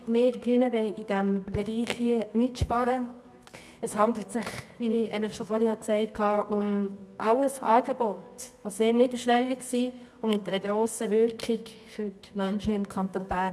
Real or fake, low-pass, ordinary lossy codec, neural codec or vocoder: fake; none; none; codec, 24 kHz, 0.9 kbps, WavTokenizer, medium music audio release